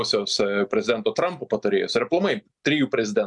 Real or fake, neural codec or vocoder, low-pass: real; none; 10.8 kHz